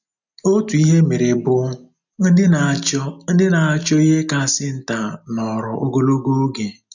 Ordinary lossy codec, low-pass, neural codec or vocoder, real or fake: none; 7.2 kHz; none; real